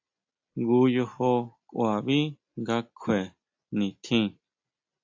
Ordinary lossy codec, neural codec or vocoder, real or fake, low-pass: AAC, 48 kbps; none; real; 7.2 kHz